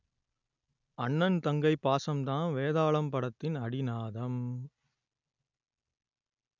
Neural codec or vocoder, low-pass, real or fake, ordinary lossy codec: none; 7.2 kHz; real; none